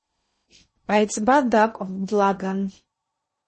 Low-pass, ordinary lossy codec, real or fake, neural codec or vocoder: 10.8 kHz; MP3, 32 kbps; fake; codec, 16 kHz in and 24 kHz out, 0.6 kbps, FocalCodec, streaming, 2048 codes